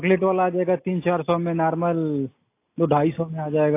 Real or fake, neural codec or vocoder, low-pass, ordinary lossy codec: real; none; 3.6 kHz; AAC, 24 kbps